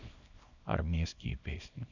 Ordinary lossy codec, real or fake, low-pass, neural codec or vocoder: none; fake; 7.2 kHz; codec, 24 kHz, 0.9 kbps, WavTokenizer, small release